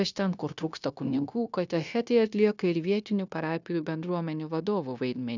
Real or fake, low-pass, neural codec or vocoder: fake; 7.2 kHz; codec, 16 kHz, 0.9 kbps, LongCat-Audio-Codec